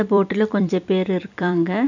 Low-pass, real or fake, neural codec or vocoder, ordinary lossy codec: 7.2 kHz; fake; vocoder, 44.1 kHz, 128 mel bands every 256 samples, BigVGAN v2; none